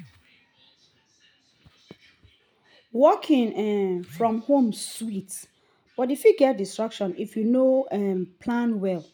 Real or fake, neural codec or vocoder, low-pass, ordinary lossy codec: real; none; none; none